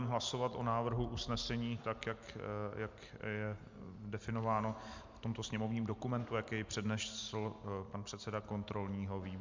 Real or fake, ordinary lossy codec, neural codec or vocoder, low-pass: real; MP3, 64 kbps; none; 7.2 kHz